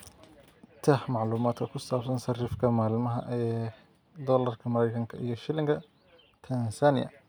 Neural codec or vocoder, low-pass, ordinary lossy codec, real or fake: none; none; none; real